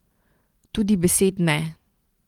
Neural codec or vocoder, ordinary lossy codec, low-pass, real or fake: vocoder, 44.1 kHz, 128 mel bands every 256 samples, BigVGAN v2; Opus, 24 kbps; 19.8 kHz; fake